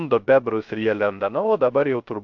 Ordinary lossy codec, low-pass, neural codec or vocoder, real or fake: AAC, 64 kbps; 7.2 kHz; codec, 16 kHz, 0.3 kbps, FocalCodec; fake